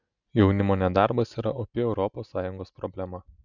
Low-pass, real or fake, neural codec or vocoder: 7.2 kHz; real; none